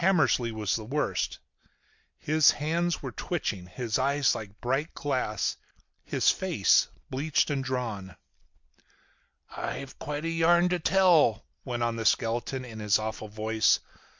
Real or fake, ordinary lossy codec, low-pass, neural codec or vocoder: real; MP3, 64 kbps; 7.2 kHz; none